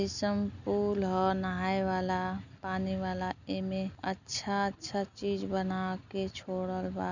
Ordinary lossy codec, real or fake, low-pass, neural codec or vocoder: none; real; 7.2 kHz; none